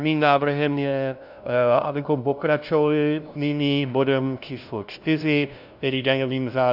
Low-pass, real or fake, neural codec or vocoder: 5.4 kHz; fake; codec, 16 kHz, 0.5 kbps, FunCodec, trained on LibriTTS, 25 frames a second